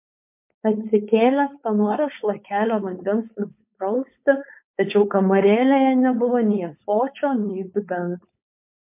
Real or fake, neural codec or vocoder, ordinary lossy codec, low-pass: fake; codec, 16 kHz, 4.8 kbps, FACodec; MP3, 32 kbps; 3.6 kHz